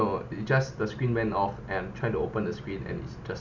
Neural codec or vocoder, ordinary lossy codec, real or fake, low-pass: none; none; real; 7.2 kHz